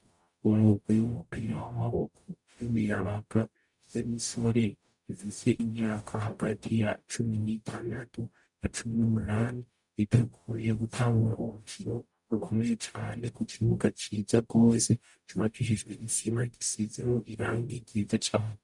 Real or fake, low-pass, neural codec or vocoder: fake; 10.8 kHz; codec, 44.1 kHz, 0.9 kbps, DAC